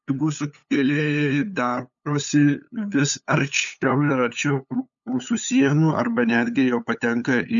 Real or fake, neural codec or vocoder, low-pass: fake; codec, 16 kHz, 8 kbps, FunCodec, trained on LibriTTS, 25 frames a second; 7.2 kHz